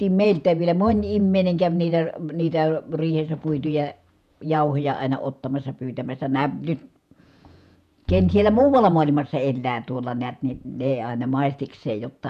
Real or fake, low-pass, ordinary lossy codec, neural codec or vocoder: fake; 14.4 kHz; none; vocoder, 48 kHz, 128 mel bands, Vocos